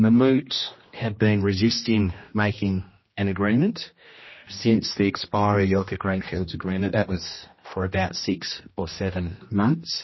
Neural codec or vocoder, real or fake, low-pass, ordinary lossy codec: codec, 16 kHz, 1 kbps, X-Codec, HuBERT features, trained on general audio; fake; 7.2 kHz; MP3, 24 kbps